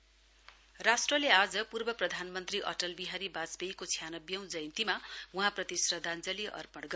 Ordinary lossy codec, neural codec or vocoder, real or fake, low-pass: none; none; real; none